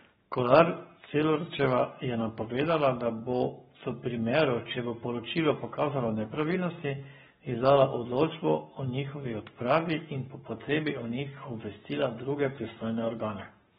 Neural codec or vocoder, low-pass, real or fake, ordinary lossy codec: codec, 44.1 kHz, 7.8 kbps, Pupu-Codec; 19.8 kHz; fake; AAC, 16 kbps